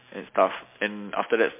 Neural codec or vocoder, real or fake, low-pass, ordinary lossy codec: none; real; 3.6 kHz; MP3, 24 kbps